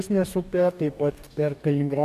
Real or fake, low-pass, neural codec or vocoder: fake; 14.4 kHz; codec, 44.1 kHz, 2.6 kbps, DAC